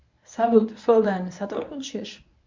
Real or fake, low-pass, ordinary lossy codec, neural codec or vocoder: fake; 7.2 kHz; MP3, 64 kbps; codec, 24 kHz, 0.9 kbps, WavTokenizer, medium speech release version 1